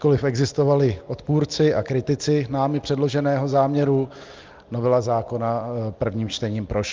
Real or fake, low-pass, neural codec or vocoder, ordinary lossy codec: real; 7.2 kHz; none; Opus, 24 kbps